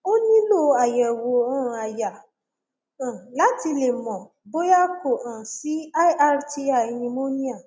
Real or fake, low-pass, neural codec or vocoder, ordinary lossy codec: real; none; none; none